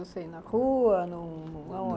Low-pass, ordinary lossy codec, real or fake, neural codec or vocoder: none; none; real; none